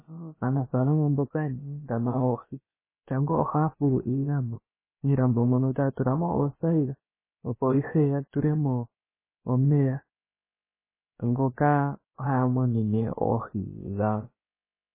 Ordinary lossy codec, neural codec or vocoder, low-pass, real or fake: MP3, 16 kbps; codec, 16 kHz, about 1 kbps, DyCAST, with the encoder's durations; 3.6 kHz; fake